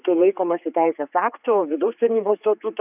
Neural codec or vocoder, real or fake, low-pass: codec, 16 kHz, 4 kbps, X-Codec, HuBERT features, trained on general audio; fake; 3.6 kHz